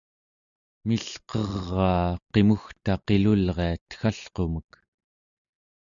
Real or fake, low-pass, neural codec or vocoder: real; 7.2 kHz; none